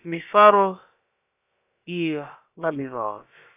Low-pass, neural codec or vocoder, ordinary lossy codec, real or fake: 3.6 kHz; codec, 16 kHz, about 1 kbps, DyCAST, with the encoder's durations; AAC, 32 kbps; fake